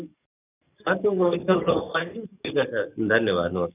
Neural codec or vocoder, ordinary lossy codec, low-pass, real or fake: codec, 44.1 kHz, 7.8 kbps, DAC; none; 3.6 kHz; fake